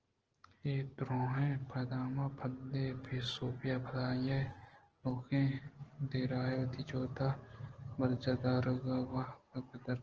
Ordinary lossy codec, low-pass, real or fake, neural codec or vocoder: Opus, 16 kbps; 7.2 kHz; real; none